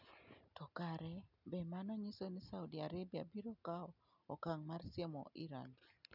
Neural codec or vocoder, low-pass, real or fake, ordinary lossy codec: none; 5.4 kHz; real; none